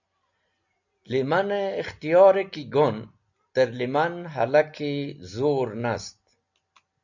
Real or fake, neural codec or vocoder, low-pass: real; none; 7.2 kHz